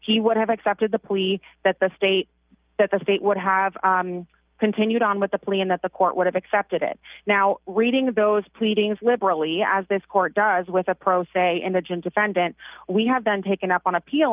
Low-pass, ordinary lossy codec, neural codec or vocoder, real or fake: 3.6 kHz; Opus, 24 kbps; none; real